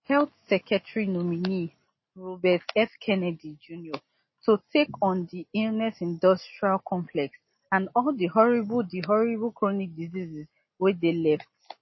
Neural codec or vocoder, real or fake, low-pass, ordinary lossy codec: none; real; 7.2 kHz; MP3, 24 kbps